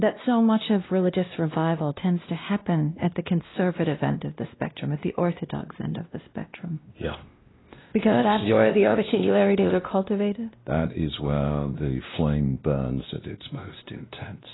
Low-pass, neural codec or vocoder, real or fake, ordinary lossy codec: 7.2 kHz; codec, 16 kHz, 1 kbps, X-Codec, WavLM features, trained on Multilingual LibriSpeech; fake; AAC, 16 kbps